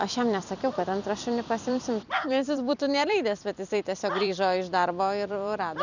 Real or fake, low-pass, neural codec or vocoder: fake; 7.2 kHz; vocoder, 44.1 kHz, 128 mel bands every 256 samples, BigVGAN v2